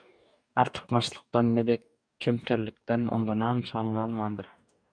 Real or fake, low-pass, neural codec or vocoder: fake; 9.9 kHz; codec, 44.1 kHz, 2.6 kbps, DAC